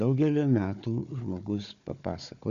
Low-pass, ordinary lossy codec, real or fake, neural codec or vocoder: 7.2 kHz; Opus, 64 kbps; fake; codec, 16 kHz, 4 kbps, FunCodec, trained on Chinese and English, 50 frames a second